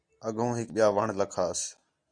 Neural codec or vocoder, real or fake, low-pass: none; real; 9.9 kHz